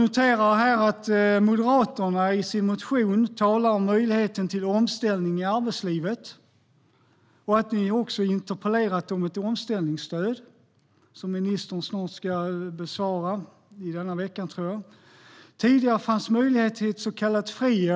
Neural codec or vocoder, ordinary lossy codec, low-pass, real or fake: none; none; none; real